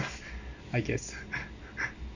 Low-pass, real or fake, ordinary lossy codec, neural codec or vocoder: 7.2 kHz; real; none; none